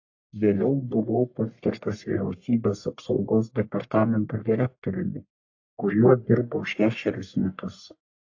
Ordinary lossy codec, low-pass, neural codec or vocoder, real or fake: AAC, 48 kbps; 7.2 kHz; codec, 44.1 kHz, 1.7 kbps, Pupu-Codec; fake